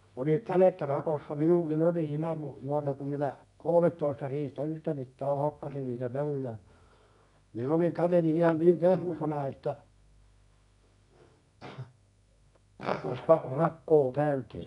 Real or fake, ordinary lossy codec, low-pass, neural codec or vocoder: fake; none; 10.8 kHz; codec, 24 kHz, 0.9 kbps, WavTokenizer, medium music audio release